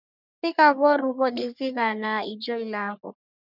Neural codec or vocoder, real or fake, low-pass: codec, 44.1 kHz, 3.4 kbps, Pupu-Codec; fake; 5.4 kHz